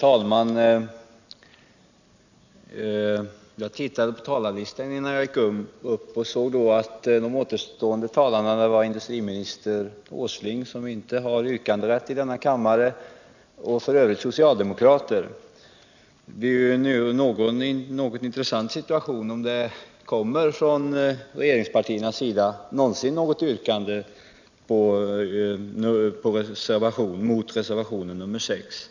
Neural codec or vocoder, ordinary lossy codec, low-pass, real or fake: none; none; 7.2 kHz; real